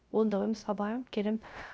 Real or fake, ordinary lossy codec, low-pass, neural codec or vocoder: fake; none; none; codec, 16 kHz, 0.3 kbps, FocalCodec